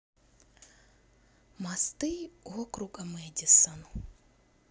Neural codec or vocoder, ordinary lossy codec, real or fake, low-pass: none; none; real; none